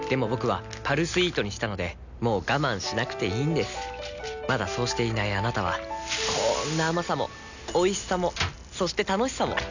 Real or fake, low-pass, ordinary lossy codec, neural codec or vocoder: real; 7.2 kHz; none; none